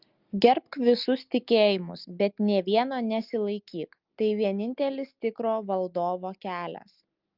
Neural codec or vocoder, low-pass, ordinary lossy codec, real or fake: none; 5.4 kHz; Opus, 32 kbps; real